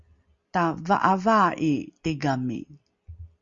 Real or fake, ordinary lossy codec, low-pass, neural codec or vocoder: real; Opus, 64 kbps; 7.2 kHz; none